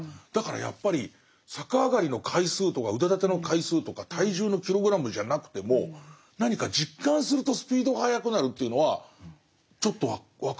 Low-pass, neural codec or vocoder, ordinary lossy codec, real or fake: none; none; none; real